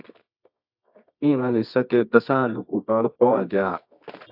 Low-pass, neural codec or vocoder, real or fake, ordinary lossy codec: 5.4 kHz; codec, 24 kHz, 0.9 kbps, WavTokenizer, medium music audio release; fake; AAC, 48 kbps